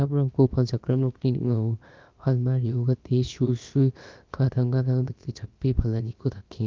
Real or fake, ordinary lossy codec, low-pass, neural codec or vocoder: fake; Opus, 24 kbps; 7.2 kHz; codec, 16 kHz, about 1 kbps, DyCAST, with the encoder's durations